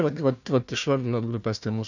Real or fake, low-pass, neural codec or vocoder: fake; 7.2 kHz; codec, 16 kHz, 1 kbps, FunCodec, trained on Chinese and English, 50 frames a second